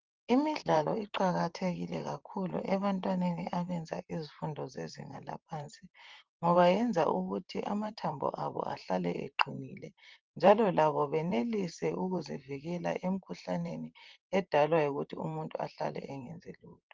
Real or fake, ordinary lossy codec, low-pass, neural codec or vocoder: fake; Opus, 24 kbps; 7.2 kHz; vocoder, 44.1 kHz, 128 mel bands, Pupu-Vocoder